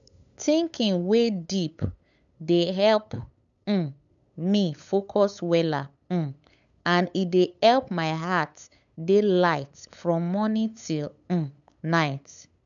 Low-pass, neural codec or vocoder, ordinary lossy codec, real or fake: 7.2 kHz; codec, 16 kHz, 8 kbps, FunCodec, trained on LibriTTS, 25 frames a second; none; fake